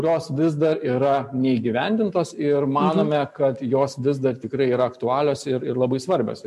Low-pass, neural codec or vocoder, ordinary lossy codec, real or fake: 14.4 kHz; none; MP3, 64 kbps; real